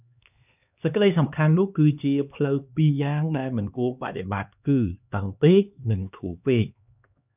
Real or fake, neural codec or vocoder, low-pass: fake; codec, 16 kHz, 2 kbps, X-Codec, HuBERT features, trained on LibriSpeech; 3.6 kHz